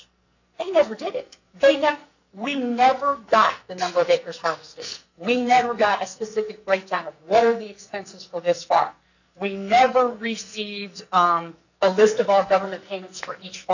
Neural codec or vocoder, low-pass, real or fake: codec, 44.1 kHz, 2.6 kbps, SNAC; 7.2 kHz; fake